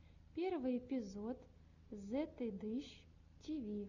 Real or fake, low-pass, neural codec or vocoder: real; 7.2 kHz; none